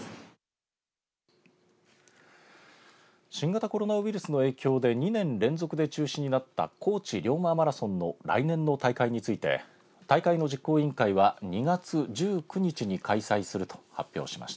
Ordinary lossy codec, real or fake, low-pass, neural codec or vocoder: none; real; none; none